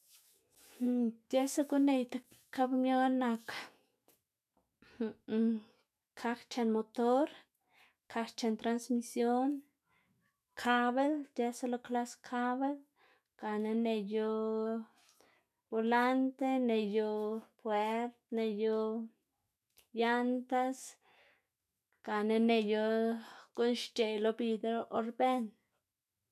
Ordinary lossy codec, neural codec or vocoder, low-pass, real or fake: none; autoencoder, 48 kHz, 128 numbers a frame, DAC-VAE, trained on Japanese speech; 14.4 kHz; fake